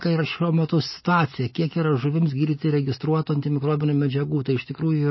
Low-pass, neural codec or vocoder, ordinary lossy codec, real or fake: 7.2 kHz; none; MP3, 24 kbps; real